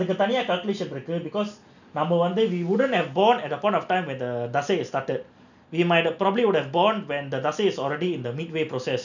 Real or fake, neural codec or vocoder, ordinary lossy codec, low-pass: real; none; none; 7.2 kHz